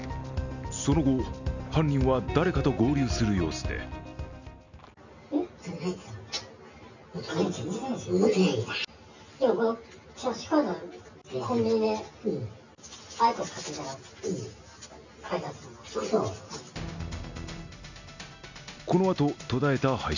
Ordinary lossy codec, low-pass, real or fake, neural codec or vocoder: none; 7.2 kHz; real; none